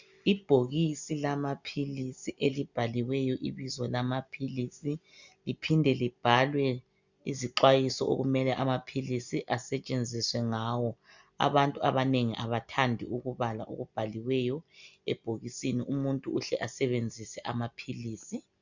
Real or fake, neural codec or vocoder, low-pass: real; none; 7.2 kHz